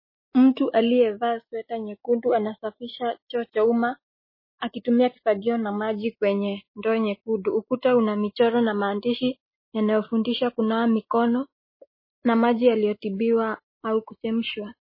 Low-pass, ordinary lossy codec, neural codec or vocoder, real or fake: 5.4 kHz; MP3, 24 kbps; none; real